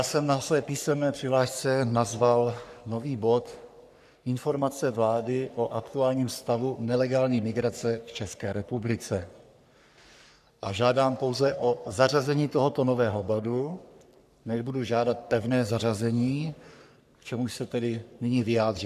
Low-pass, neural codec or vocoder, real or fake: 14.4 kHz; codec, 44.1 kHz, 3.4 kbps, Pupu-Codec; fake